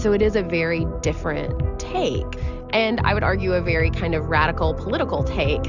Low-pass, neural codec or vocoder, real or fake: 7.2 kHz; none; real